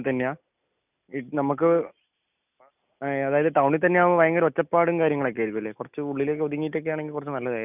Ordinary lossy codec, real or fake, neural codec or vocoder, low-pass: none; real; none; 3.6 kHz